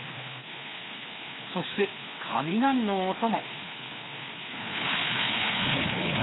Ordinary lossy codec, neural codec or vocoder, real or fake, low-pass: AAC, 16 kbps; codec, 16 kHz, 2 kbps, FreqCodec, larger model; fake; 7.2 kHz